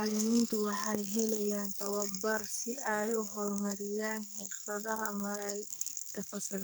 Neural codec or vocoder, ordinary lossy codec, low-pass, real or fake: codec, 44.1 kHz, 2.6 kbps, SNAC; none; none; fake